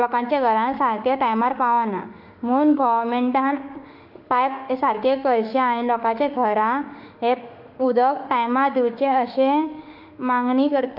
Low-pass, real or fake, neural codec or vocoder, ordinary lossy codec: 5.4 kHz; fake; autoencoder, 48 kHz, 32 numbers a frame, DAC-VAE, trained on Japanese speech; none